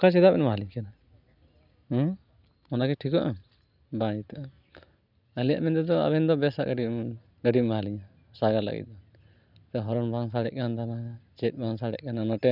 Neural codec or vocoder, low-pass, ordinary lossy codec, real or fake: none; 5.4 kHz; none; real